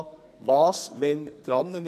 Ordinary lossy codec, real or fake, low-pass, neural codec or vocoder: none; fake; 14.4 kHz; codec, 44.1 kHz, 2.6 kbps, SNAC